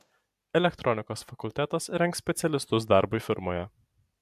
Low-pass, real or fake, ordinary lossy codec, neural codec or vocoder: 14.4 kHz; real; MP3, 96 kbps; none